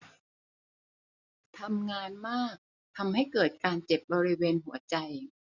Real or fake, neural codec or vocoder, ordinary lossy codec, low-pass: real; none; none; 7.2 kHz